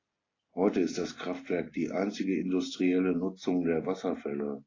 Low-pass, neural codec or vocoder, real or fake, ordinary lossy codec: 7.2 kHz; none; real; AAC, 32 kbps